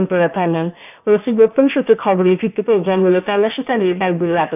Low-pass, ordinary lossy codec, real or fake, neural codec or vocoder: 3.6 kHz; none; fake; codec, 16 kHz, about 1 kbps, DyCAST, with the encoder's durations